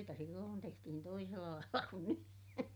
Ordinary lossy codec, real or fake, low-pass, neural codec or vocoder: none; real; none; none